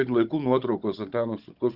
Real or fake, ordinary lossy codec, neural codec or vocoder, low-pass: fake; Opus, 32 kbps; codec, 16 kHz, 16 kbps, FunCodec, trained on Chinese and English, 50 frames a second; 5.4 kHz